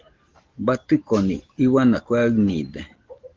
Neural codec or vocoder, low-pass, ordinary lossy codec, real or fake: none; 7.2 kHz; Opus, 16 kbps; real